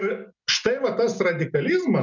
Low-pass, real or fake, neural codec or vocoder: 7.2 kHz; real; none